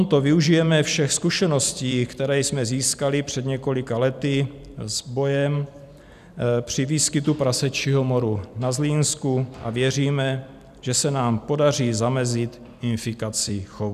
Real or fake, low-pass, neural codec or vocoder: fake; 14.4 kHz; vocoder, 44.1 kHz, 128 mel bands every 256 samples, BigVGAN v2